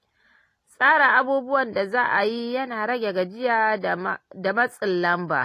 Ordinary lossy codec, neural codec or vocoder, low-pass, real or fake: AAC, 48 kbps; none; 14.4 kHz; real